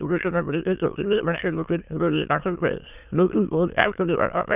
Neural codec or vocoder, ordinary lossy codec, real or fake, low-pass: autoencoder, 22.05 kHz, a latent of 192 numbers a frame, VITS, trained on many speakers; none; fake; 3.6 kHz